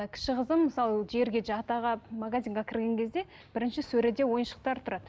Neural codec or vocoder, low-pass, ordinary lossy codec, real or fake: none; none; none; real